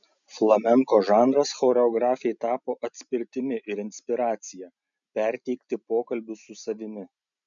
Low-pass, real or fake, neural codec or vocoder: 7.2 kHz; real; none